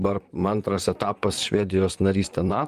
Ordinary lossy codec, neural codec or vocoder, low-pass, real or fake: Opus, 32 kbps; vocoder, 44.1 kHz, 128 mel bands, Pupu-Vocoder; 14.4 kHz; fake